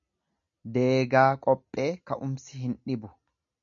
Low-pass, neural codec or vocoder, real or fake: 7.2 kHz; none; real